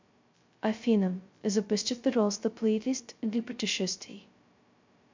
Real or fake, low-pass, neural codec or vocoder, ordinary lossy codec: fake; 7.2 kHz; codec, 16 kHz, 0.2 kbps, FocalCodec; MP3, 64 kbps